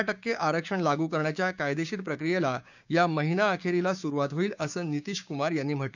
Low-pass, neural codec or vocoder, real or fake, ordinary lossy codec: 7.2 kHz; codec, 16 kHz, 6 kbps, DAC; fake; none